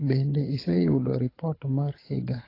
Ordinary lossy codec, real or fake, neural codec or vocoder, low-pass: AAC, 24 kbps; fake; codec, 24 kHz, 6 kbps, HILCodec; 5.4 kHz